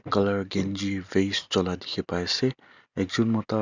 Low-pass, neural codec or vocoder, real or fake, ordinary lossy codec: 7.2 kHz; none; real; Opus, 64 kbps